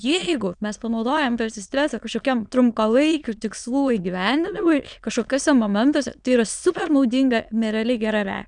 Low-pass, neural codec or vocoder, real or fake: 9.9 kHz; autoencoder, 22.05 kHz, a latent of 192 numbers a frame, VITS, trained on many speakers; fake